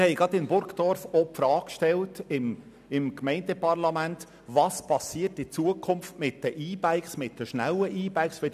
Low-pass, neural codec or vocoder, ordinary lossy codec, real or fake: 14.4 kHz; none; none; real